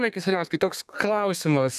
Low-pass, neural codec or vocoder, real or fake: 14.4 kHz; codec, 32 kHz, 1.9 kbps, SNAC; fake